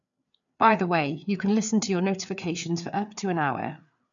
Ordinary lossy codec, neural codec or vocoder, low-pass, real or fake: none; codec, 16 kHz, 4 kbps, FreqCodec, larger model; 7.2 kHz; fake